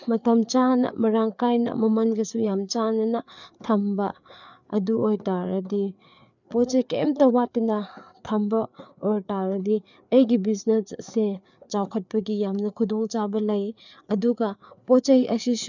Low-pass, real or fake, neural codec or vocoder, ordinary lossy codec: 7.2 kHz; fake; codec, 16 kHz, 4 kbps, FreqCodec, larger model; none